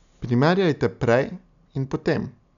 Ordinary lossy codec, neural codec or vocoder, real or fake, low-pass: MP3, 96 kbps; none; real; 7.2 kHz